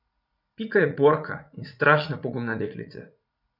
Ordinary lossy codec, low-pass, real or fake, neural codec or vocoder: none; 5.4 kHz; fake; vocoder, 44.1 kHz, 80 mel bands, Vocos